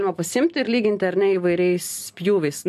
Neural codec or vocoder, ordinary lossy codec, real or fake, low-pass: none; MP3, 64 kbps; real; 14.4 kHz